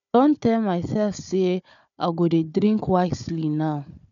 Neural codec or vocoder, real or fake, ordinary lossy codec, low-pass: codec, 16 kHz, 4 kbps, FunCodec, trained on Chinese and English, 50 frames a second; fake; none; 7.2 kHz